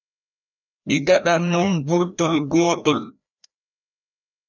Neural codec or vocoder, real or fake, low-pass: codec, 16 kHz, 1 kbps, FreqCodec, larger model; fake; 7.2 kHz